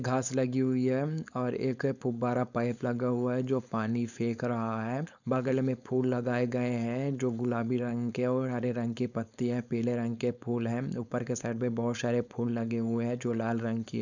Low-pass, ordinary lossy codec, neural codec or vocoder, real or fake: 7.2 kHz; none; codec, 16 kHz, 4.8 kbps, FACodec; fake